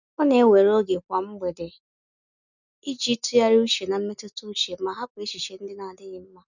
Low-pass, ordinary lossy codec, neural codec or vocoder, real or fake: 7.2 kHz; none; none; real